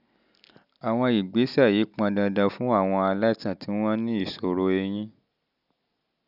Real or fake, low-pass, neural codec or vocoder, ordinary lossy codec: real; 5.4 kHz; none; none